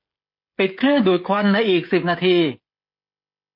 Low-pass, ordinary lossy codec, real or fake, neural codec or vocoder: 5.4 kHz; MP3, 32 kbps; fake; codec, 16 kHz, 16 kbps, FreqCodec, smaller model